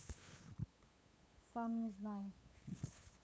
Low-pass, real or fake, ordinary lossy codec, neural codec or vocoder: none; fake; none; codec, 16 kHz, 8 kbps, FunCodec, trained on LibriTTS, 25 frames a second